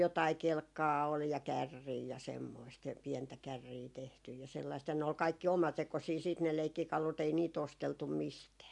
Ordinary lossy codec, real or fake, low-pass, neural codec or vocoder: AAC, 64 kbps; real; 10.8 kHz; none